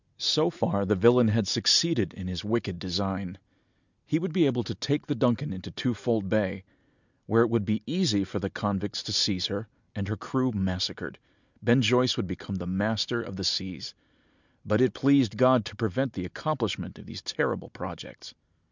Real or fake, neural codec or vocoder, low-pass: real; none; 7.2 kHz